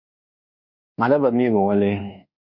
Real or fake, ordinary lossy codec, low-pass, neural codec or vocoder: fake; Opus, 64 kbps; 5.4 kHz; codec, 24 kHz, 1.2 kbps, DualCodec